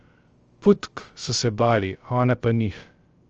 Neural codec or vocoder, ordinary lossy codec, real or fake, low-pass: codec, 16 kHz, 0.3 kbps, FocalCodec; Opus, 24 kbps; fake; 7.2 kHz